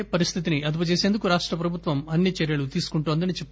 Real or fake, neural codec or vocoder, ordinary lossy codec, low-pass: real; none; none; none